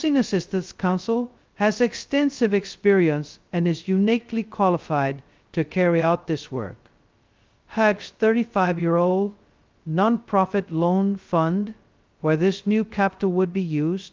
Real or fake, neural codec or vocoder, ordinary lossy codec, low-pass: fake; codec, 16 kHz, 0.2 kbps, FocalCodec; Opus, 32 kbps; 7.2 kHz